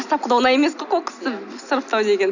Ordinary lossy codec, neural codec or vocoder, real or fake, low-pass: none; none; real; 7.2 kHz